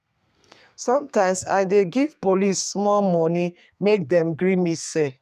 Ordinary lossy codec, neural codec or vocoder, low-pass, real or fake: none; codec, 32 kHz, 1.9 kbps, SNAC; 14.4 kHz; fake